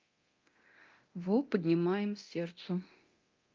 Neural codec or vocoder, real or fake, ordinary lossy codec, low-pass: codec, 24 kHz, 0.9 kbps, DualCodec; fake; Opus, 32 kbps; 7.2 kHz